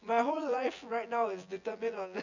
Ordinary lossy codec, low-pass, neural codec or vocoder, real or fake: none; 7.2 kHz; vocoder, 24 kHz, 100 mel bands, Vocos; fake